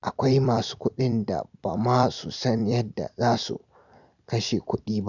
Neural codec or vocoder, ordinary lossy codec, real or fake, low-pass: vocoder, 44.1 kHz, 128 mel bands every 256 samples, BigVGAN v2; none; fake; 7.2 kHz